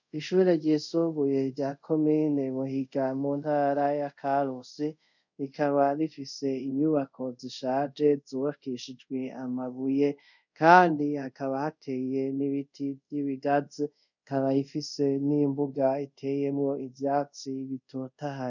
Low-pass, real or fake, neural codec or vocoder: 7.2 kHz; fake; codec, 24 kHz, 0.5 kbps, DualCodec